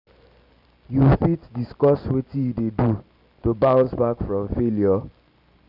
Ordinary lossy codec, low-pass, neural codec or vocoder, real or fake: none; 5.4 kHz; none; real